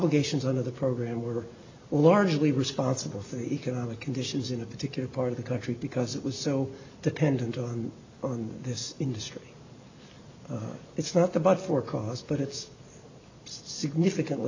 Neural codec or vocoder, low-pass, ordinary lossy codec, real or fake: none; 7.2 kHz; AAC, 48 kbps; real